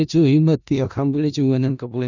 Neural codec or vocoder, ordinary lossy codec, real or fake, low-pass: codec, 16 kHz in and 24 kHz out, 0.4 kbps, LongCat-Audio-Codec, four codebook decoder; none; fake; 7.2 kHz